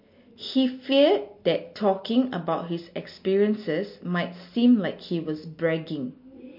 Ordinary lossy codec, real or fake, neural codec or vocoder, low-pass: MP3, 32 kbps; real; none; 5.4 kHz